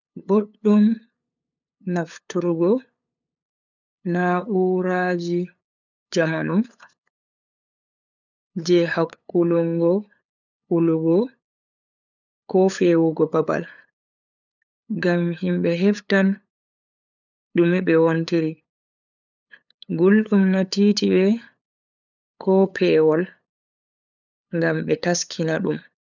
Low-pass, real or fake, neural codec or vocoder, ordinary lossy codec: 7.2 kHz; fake; codec, 16 kHz, 8 kbps, FunCodec, trained on LibriTTS, 25 frames a second; none